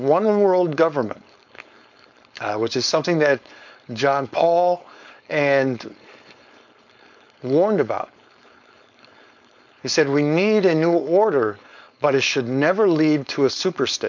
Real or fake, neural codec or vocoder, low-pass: fake; codec, 16 kHz, 4.8 kbps, FACodec; 7.2 kHz